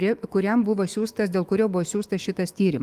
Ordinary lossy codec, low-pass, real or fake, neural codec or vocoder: Opus, 24 kbps; 14.4 kHz; fake; autoencoder, 48 kHz, 128 numbers a frame, DAC-VAE, trained on Japanese speech